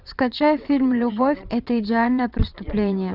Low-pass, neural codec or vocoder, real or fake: 5.4 kHz; vocoder, 22.05 kHz, 80 mel bands, WaveNeXt; fake